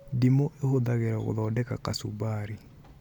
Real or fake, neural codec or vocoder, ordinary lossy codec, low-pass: real; none; MP3, 96 kbps; 19.8 kHz